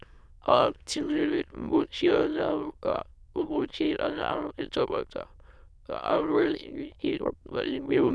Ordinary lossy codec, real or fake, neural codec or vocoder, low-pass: none; fake; autoencoder, 22.05 kHz, a latent of 192 numbers a frame, VITS, trained on many speakers; none